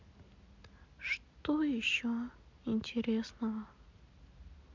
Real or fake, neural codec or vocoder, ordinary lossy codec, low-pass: real; none; MP3, 64 kbps; 7.2 kHz